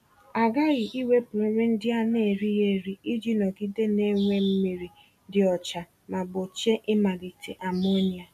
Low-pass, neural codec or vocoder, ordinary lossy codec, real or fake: 14.4 kHz; none; none; real